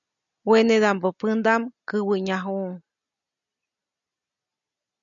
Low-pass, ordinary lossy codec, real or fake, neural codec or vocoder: 7.2 kHz; MP3, 96 kbps; real; none